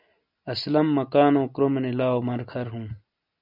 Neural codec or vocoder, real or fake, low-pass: none; real; 5.4 kHz